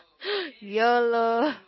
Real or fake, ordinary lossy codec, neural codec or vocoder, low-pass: real; MP3, 24 kbps; none; 7.2 kHz